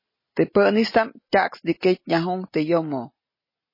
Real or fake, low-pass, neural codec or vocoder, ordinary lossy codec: real; 5.4 kHz; none; MP3, 24 kbps